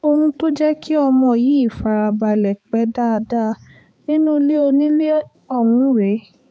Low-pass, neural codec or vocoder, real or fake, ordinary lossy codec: none; codec, 16 kHz, 4 kbps, X-Codec, HuBERT features, trained on balanced general audio; fake; none